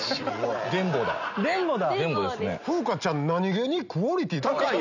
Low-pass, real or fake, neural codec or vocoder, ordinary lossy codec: 7.2 kHz; real; none; none